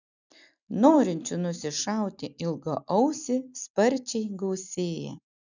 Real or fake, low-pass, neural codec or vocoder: real; 7.2 kHz; none